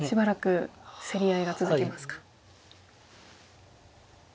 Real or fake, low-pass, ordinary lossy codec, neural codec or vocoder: real; none; none; none